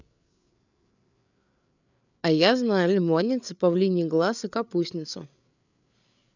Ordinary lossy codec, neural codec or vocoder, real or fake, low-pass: none; codec, 16 kHz, 8 kbps, FreqCodec, larger model; fake; 7.2 kHz